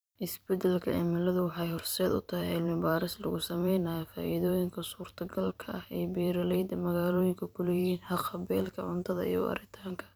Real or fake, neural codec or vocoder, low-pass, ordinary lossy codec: fake; vocoder, 44.1 kHz, 128 mel bands every 512 samples, BigVGAN v2; none; none